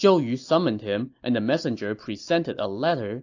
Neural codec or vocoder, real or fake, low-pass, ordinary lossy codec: none; real; 7.2 kHz; AAC, 48 kbps